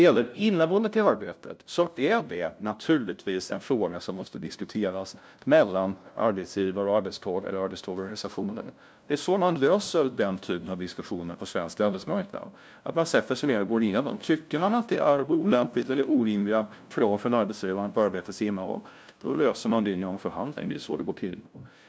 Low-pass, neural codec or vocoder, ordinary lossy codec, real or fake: none; codec, 16 kHz, 0.5 kbps, FunCodec, trained on LibriTTS, 25 frames a second; none; fake